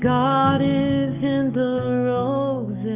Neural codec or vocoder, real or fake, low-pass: codec, 44.1 kHz, 7.8 kbps, DAC; fake; 3.6 kHz